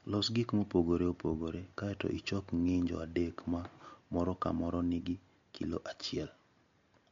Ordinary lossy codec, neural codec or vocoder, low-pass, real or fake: MP3, 48 kbps; none; 7.2 kHz; real